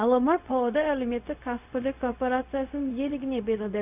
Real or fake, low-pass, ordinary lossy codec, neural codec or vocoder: fake; 3.6 kHz; none; codec, 16 kHz, 0.4 kbps, LongCat-Audio-Codec